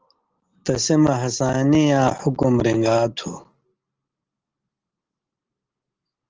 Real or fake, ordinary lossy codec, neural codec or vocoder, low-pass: real; Opus, 16 kbps; none; 7.2 kHz